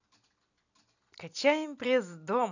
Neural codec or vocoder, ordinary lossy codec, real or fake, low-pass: vocoder, 44.1 kHz, 128 mel bands every 256 samples, BigVGAN v2; none; fake; 7.2 kHz